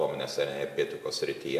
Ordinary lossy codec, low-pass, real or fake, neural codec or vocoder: AAC, 96 kbps; 14.4 kHz; real; none